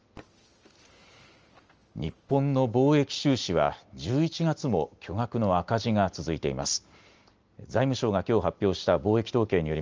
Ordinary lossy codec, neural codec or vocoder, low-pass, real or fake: Opus, 24 kbps; none; 7.2 kHz; real